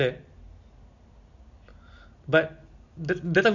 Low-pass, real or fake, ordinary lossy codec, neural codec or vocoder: 7.2 kHz; real; none; none